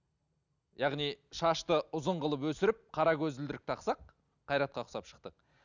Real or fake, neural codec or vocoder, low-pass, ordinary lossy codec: real; none; 7.2 kHz; none